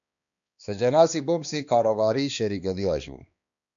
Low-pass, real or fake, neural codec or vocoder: 7.2 kHz; fake; codec, 16 kHz, 2 kbps, X-Codec, HuBERT features, trained on balanced general audio